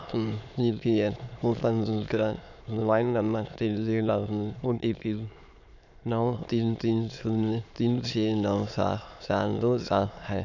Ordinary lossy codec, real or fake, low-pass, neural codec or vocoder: none; fake; 7.2 kHz; autoencoder, 22.05 kHz, a latent of 192 numbers a frame, VITS, trained on many speakers